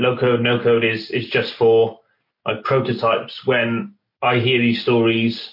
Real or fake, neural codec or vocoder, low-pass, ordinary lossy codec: real; none; 5.4 kHz; MP3, 32 kbps